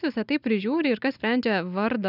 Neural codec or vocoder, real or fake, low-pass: none; real; 5.4 kHz